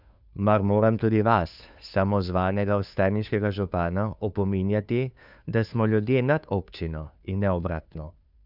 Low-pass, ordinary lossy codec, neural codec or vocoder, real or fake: 5.4 kHz; none; codec, 16 kHz, 2 kbps, FunCodec, trained on Chinese and English, 25 frames a second; fake